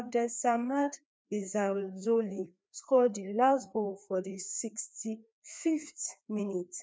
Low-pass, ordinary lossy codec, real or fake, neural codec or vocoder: none; none; fake; codec, 16 kHz, 2 kbps, FreqCodec, larger model